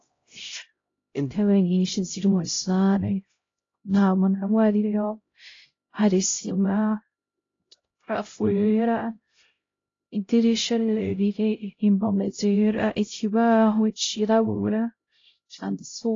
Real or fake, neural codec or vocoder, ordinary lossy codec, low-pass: fake; codec, 16 kHz, 0.5 kbps, X-Codec, HuBERT features, trained on LibriSpeech; AAC, 32 kbps; 7.2 kHz